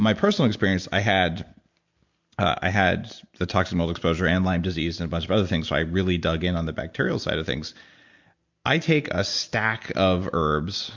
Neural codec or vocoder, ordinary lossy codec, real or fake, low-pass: none; MP3, 64 kbps; real; 7.2 kHz